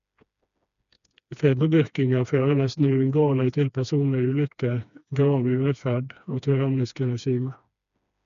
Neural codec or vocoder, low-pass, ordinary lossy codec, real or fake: codec, 16 kHz, 2 kbps, FreqCodec, smaller model; 7.2 kHz; none; fake